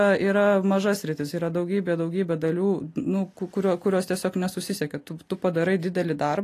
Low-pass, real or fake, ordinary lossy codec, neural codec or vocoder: 14.4 kHz; real; AAC, 48 kbps; none